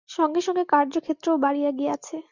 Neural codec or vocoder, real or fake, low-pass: none; real; 7.2 kHz